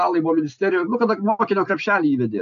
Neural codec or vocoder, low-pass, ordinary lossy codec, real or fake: none; 7.2 kHz; AAC, 96 kbps; real